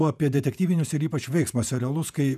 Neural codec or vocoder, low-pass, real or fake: vocoder, 48 kHz, 128 mel bands, Vocos; 14.4 kHz; fake